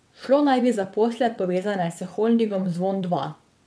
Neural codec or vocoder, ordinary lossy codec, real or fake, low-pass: vocoder, 22.05 kHz, 80 mel bands, WaveNeXt; none; fake; none